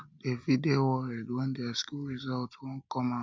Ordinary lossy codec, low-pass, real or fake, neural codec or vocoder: none; none; real; none